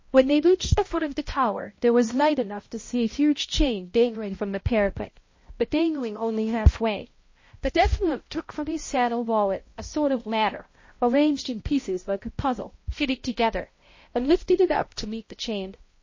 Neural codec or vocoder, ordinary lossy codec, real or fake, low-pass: codec, 16 kHz, 0.5 kbps, X-Codec, HuBERT features, trained on balanced general audio; MP3, 32 kbps; fake; 7.2 kHz